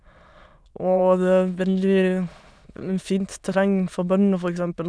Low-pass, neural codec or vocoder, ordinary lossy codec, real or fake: none; autoencoder, 22.05 kHz, a latent of 192 numbers a frame, VITS, trained on many speakers; none; fake